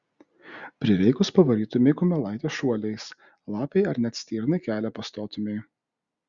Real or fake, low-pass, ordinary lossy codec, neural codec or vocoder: real; 7.2 kHz; Opus, 64 kbps; none